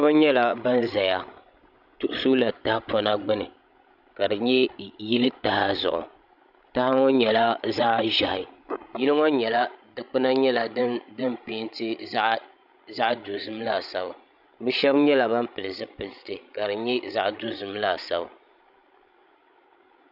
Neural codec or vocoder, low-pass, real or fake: codec, 16 kHz, 16 kbps, FreqCodec, larger model; 5.4 kHz; fake